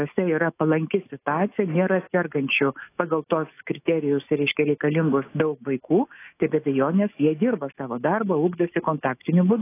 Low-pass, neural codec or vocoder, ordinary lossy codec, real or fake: 3.6 kHz; autoencoder, 48 kHz, 128 numbers a frame, DAC-VAE, trained on Japanese speech; AAC, 24 kbps; fake